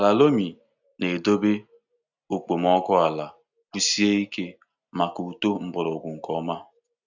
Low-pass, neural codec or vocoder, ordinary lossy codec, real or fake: 7.2 kHz; none; none; real